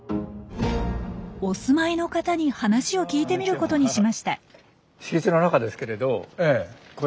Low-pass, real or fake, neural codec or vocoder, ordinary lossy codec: none; real; none; none